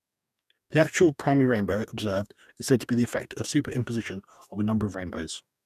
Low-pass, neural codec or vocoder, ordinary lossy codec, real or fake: 14.4 kHz; codec, 44.1 kHz, 2.6 kbps, DAC; none; fake